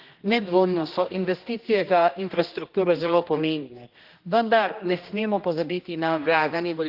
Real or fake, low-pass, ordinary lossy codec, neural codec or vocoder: fake; 5.4 kHz; Opus, 32 kbps; codec, 16 kHz, 1 kbps, X-Codec, HuBERT features, trained on general audio